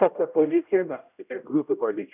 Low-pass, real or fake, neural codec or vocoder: 3.6 kHz; fake; codec, 16 kHz, 0.5 kbps, X-Codec, HuBERT features, trained on general audio